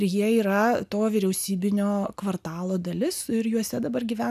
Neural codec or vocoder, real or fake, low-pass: none; real; 14.4 kHz